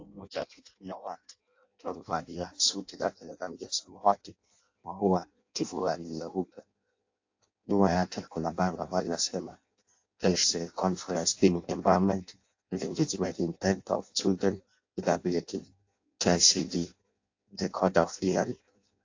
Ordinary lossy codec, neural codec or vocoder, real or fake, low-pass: AAC, 48 kbps; codec, 16 kHz in and 24 kHz out, 0.6 kbps, FireRedTTS-2 codec; fake; 7.2 kHz